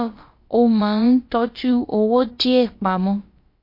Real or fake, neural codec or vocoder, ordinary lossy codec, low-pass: fake; codec, 16 kHz, about 1 kbps, DyCAST, with the encoder's durations; MP3, 32 kbps; 5.4 kHz